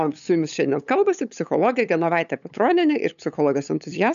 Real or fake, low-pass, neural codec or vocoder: fake; 7.2 kHz; codec, 16 kHz, 8 kbps, FunCodec, trained on LibriTTS, 25 frames a second